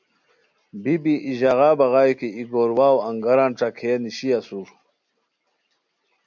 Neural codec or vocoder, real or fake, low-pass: none; real; 7.2 kHz